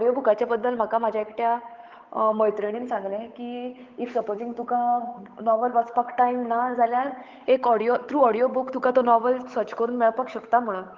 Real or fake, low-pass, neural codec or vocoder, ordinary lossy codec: fake; 7.2 kHz; codec, 16 kHz, 8 kbps, FunCodec, trained on Chinese and English, 25 frames a second; Opus, 32 kbps